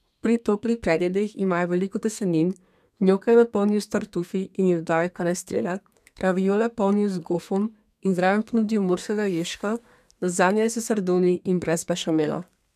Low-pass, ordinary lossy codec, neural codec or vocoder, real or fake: 14.4 kHz; none; codec, 32 kHz, 1.9 kbps, SNAC; fake